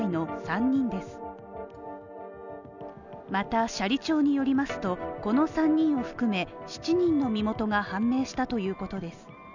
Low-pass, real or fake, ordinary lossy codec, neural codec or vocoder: 7.2 kHz; real; none; none